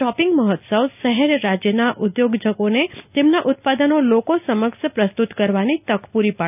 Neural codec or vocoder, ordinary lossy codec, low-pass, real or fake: none; none; 3.6 kHz; real